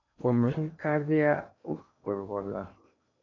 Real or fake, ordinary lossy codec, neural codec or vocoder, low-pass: fake; MP3, 64 kbps; codec, 16 kHz in and 24 kHz out, 0.8 kbps, FocalCodec, streaming, 65536 codes; 7.2 kHz